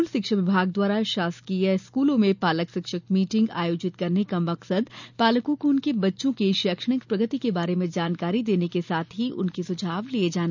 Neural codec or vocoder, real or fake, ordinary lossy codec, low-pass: none; real; none; 7.2 kHz